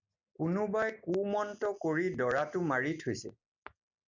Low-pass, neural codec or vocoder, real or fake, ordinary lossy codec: 7.2 kHz; none; real; MP3, 64 kbps